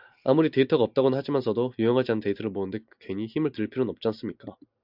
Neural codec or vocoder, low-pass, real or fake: none; 5.4 kHz; real